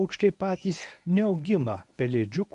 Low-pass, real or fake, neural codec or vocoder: 10.8 kHz; fake; codec, 24 kHz, 0.9 kbps, WavTokenizer, medium speech release version 1